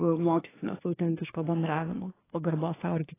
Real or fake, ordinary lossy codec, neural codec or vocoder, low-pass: fake; AAC, 16 kbps; codec, 24 kHz, 1 kbps, SNAC; 3.6 kHz